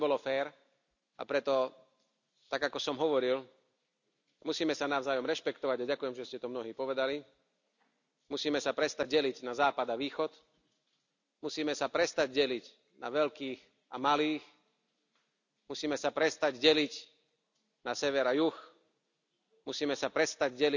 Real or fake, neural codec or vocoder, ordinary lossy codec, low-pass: real; none; none; 7.2 kHz